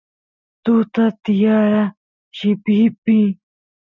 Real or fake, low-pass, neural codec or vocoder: real; 7.2 kHz; none